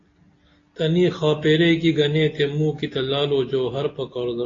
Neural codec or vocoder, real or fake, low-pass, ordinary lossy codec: none; real; 7.2 kHz; AAC, 32 kbps